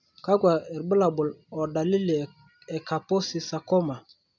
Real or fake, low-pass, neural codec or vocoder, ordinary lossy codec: real; 7.2 kHz; none; none